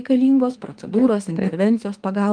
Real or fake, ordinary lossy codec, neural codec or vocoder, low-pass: fake; Opus, 32 kbps; autoencoder, 48 kHz, 32 numbers a frame, DAC-VAE, trained on Japanese speech; 9.9 kHz